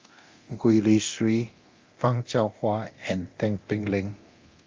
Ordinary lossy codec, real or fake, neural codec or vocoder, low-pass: Opus, 32 kbps; fake; codec, 24 kHz, 0.9 kbps, DualCodec; 7.2 kHz